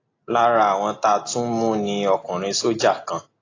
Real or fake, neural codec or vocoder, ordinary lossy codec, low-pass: real; none; AAC, 32 kbps; 7.2 kHz